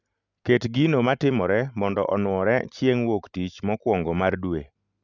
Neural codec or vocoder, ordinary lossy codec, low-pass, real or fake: none; none; 7.2 kHz; real